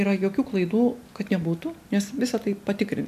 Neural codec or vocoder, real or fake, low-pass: none; real; 14.4 kHz